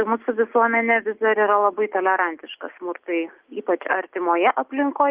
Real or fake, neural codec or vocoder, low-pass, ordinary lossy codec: real; none; 3.6 kHz; Opus, 24 kbps